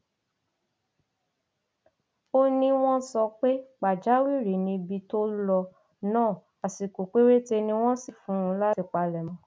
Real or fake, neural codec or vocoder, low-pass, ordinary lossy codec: real; none; none; none